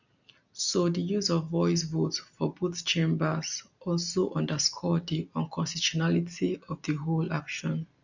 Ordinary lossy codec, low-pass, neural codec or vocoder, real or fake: none; 7.2 kHz; none; real